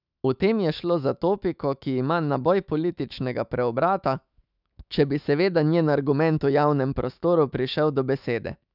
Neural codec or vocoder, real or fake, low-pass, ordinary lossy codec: autoencoder, 48 kHz, 128 numbers a frame, DAC-VAE, trained on Japanese speech; fake; 5.4 kHz; none